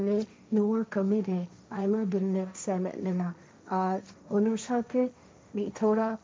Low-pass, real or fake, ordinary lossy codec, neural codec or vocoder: none; fake; none; codec, 16 kHz, 1.1 kbps, Voila-Tokenizer